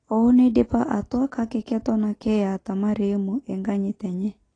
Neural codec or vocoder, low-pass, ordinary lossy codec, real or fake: none; 9.9 kHz; AAC, 32 kbps; real